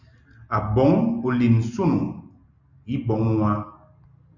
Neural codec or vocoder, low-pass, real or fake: none; 7.2 kHz; real